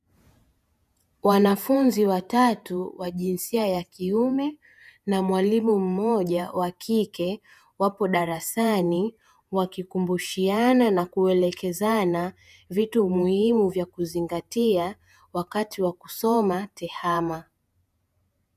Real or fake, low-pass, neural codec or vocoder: fake; 14.4 kHz; vocoder, 48 kHz, 128 mel bands, Vocos